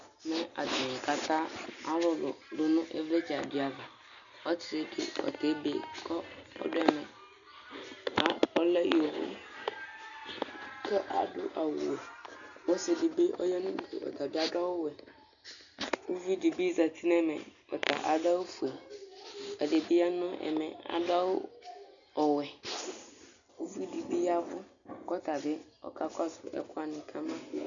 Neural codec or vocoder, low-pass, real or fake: none; 7.2 kHz; real